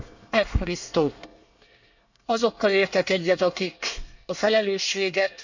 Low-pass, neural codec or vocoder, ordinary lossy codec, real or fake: 7.2 kHz; codec, 24 kHz, 1 kbps, SNAC; none; fake